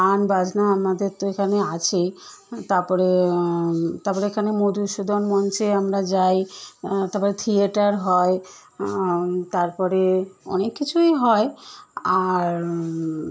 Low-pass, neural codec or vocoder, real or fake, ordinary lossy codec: none; none; real; none